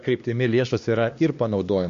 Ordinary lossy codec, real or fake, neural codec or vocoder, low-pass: MP3, 48 kbps; fake; codec, 16 kHz, 2 kbps, X-Codec, HuBERT features, trained on LibriSpeech; 7.2 kHz